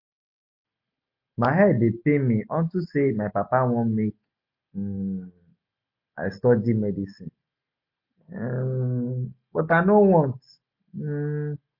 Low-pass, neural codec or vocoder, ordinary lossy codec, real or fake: 5.4 kHz; none; MP3, 32 kbps; real